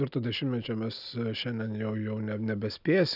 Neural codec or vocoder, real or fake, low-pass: none; real; 5.4 kHz